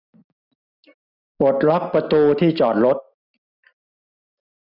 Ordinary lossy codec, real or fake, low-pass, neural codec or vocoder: none; real; 5.4 kHz; none